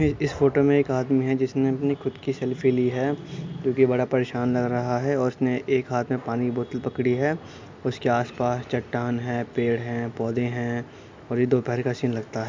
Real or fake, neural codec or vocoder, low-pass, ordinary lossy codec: real; none; 7.2 kHz; MP3, 64 kbps